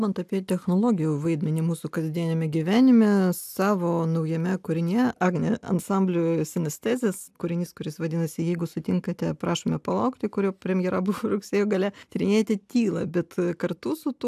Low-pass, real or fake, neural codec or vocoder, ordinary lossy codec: 14.4 kHz; real; none; AAC, 96 kbps